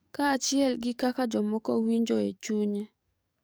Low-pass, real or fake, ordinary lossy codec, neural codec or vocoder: none; fake; none; codec, 44.1 kHz, 7.8 kbps, DAC